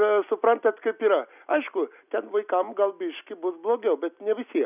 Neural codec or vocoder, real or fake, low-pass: none; real; 3.6 kHz